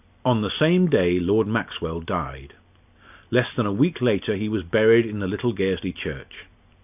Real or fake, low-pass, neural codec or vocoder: real; 3.6 kHz; none